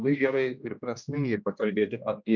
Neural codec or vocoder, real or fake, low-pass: codec, 16 kHz, 1 kbps, X-Codec, HuBERT features, trained on general audio; fake; 7.2 kHz